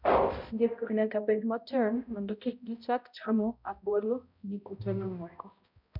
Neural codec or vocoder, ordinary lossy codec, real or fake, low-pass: codec, 16 kHz, 0.5 kbps, X-Codec, HuBERT features, trained on balanced general audio; none; fake; 5.4 kHz